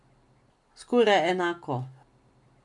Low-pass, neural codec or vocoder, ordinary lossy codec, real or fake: 10.8 kHz; none; MP3, 64 kbps; real